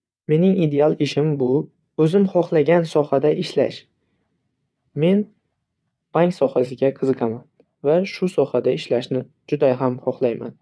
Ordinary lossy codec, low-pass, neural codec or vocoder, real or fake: none; none; vocoder, 22.05 kHz, 80 mel bands, WaveNeXt; fake